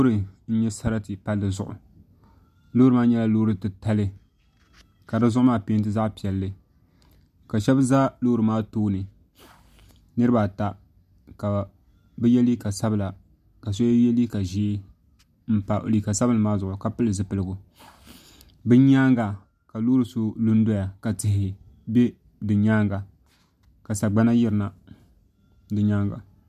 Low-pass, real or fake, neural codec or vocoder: 14.4 kHz; real; none